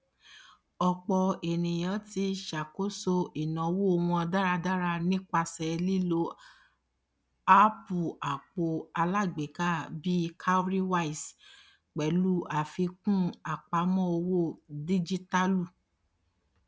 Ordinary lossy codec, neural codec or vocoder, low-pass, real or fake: none; none; none; real